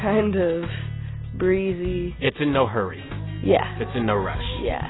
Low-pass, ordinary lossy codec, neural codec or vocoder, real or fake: 7.2 kHz; AAC, 16 kbps; none; real